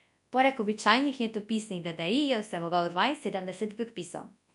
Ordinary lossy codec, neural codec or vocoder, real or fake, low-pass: none; codec, 24 kHz, 0.9 kbps, WavTokenizer, large speech release; fake; 10.8 kHz